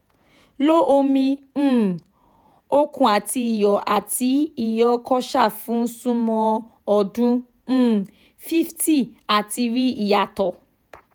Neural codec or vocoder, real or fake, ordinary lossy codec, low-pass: vocoder, 48 kHz, 128 mel bands, Vocos; fake; none; none